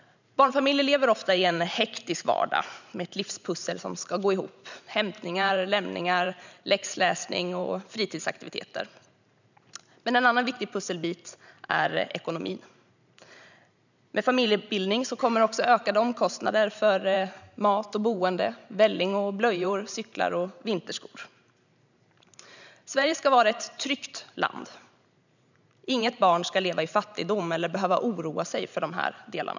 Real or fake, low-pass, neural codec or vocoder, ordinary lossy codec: fake; 7.2 kHz; vocoder, 44.1 kHz, 128 mel bands every 512 samples, BigVGAN v2; none